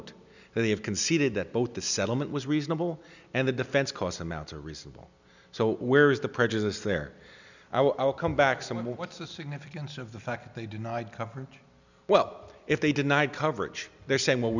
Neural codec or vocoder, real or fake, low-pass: none; real; 7.2 kHz